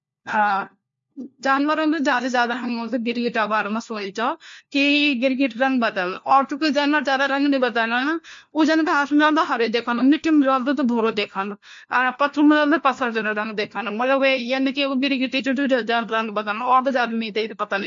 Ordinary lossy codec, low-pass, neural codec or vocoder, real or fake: MP3, 48 kbps; 7.2 kHz; codec, 16 kHz, 1 kbps, FunCodec, trained on LibriTTS, 50 frames a second; fake